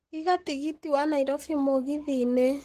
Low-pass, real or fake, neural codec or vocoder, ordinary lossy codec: 14.4 kHz; fake; codec, 44.1 kHz, 7.8 kbps, Pupu-Codec; Opus, 16 kbps